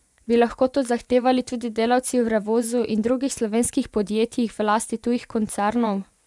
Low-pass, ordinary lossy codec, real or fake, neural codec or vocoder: none; none; fake; vocoder, 24 kHz, 100 mel bands, Vocos